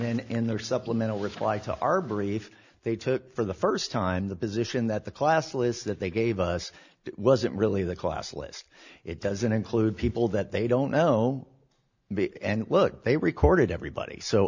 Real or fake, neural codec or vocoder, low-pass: real; none; 7.2 kHz